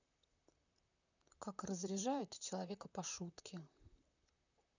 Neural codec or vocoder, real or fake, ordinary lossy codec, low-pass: vocoder, 44.1 kHz, 128 mel bands, Pupu-Vocoder; fake; none; 7.2 kHz